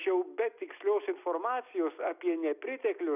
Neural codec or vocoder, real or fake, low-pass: none; real; 3.6 kHz